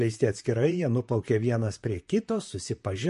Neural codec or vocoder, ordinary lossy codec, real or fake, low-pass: vocoder, 44.1 kHz, 128 mel bands, Pupu-Vocoder; MP3, 48 kbps; fake; 14.4 kHz